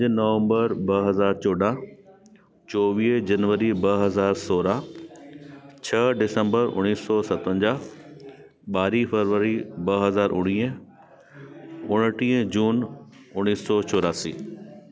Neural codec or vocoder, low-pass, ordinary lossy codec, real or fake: none; none; none; real